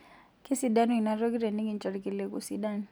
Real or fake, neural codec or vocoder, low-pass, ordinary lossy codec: real; none; none; none